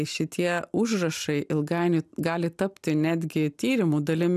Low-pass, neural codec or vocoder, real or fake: 14.4 kHz; none; real